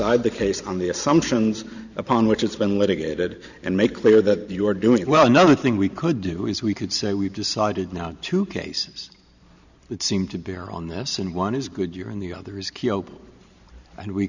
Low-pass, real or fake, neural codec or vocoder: 7.2 kHz; fake; vocoder, 44.1 kHz, 128 mel bands every 512 samples, BigVGAN v2